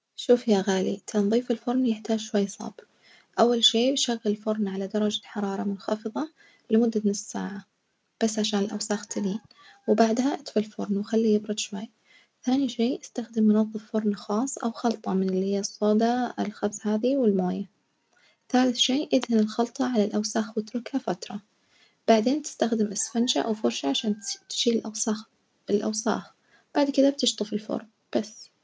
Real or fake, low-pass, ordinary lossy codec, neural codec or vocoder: real; none; none; none